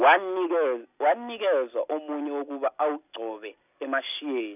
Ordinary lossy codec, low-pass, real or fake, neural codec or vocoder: none; 3.6 kHz; real; none